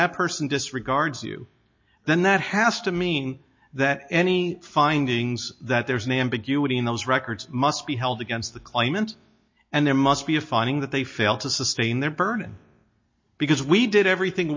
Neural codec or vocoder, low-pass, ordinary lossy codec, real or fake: none; 7.2 kHz; MP3, 32 kbps; real